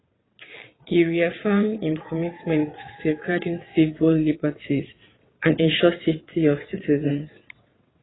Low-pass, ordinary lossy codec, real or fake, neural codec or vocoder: 7.2 kHz; AAC, 16 kbps; fake; vocoder, 22.05 kHz, 80 mel bands, WaveNeXt